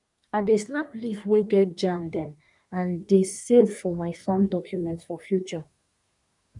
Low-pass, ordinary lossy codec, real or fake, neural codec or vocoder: 10.8 kHz; none; fake; codec, 24 kHz, 1 kbps, SNAC